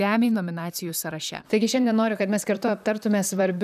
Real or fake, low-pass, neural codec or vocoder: fake; 14.4 kHz; vocoder, 44.1 kHz, 128 mel bands, Pupu-Vocoder